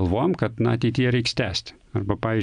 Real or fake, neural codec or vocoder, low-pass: real; none; 9.9 kHz